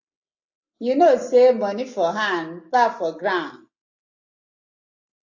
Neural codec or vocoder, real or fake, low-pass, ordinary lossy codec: none; real; 7.2 kHz; AAC, 48 kbps